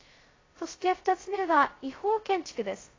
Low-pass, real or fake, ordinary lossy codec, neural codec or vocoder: 7.2 kHz; fake; AAC, 32 kbps; codec, 16 kHz, 0.2 kbps, FocalCodec